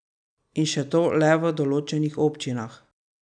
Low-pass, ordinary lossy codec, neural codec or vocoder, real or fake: 9.9 kHz; none; none; real